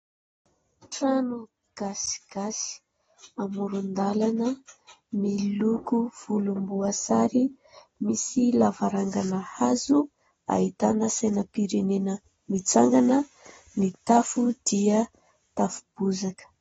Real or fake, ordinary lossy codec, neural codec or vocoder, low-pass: real; AAC, 24 kbps; none; 19.8 kHz